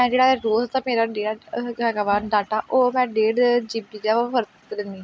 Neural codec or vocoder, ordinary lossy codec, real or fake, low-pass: none; none; real; none